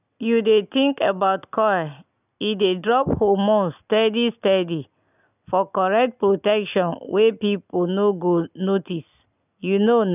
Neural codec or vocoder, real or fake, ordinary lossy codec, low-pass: none; real; none; 3.6 kHz